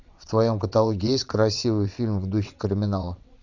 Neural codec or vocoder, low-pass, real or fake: vocoder, 22.05 kHz, 80 mel bands, WaveNeXt; 7.2 kHz; fake